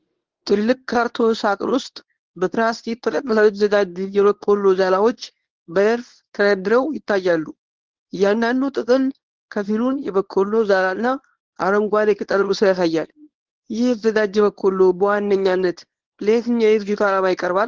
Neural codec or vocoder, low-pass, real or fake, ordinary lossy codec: codec, 24 kHz, 0.9 kbps, WavTokenizer, medium speech release version 1; 7.2 kHz; fake; Opus, 16 kbps